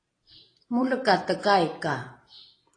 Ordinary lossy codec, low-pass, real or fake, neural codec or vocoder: AAC, 32 kbps; 9.9 kHz; fake; vocoder, 44.1 kHz, 128 mel bands every 256 samples, BigVGAN v2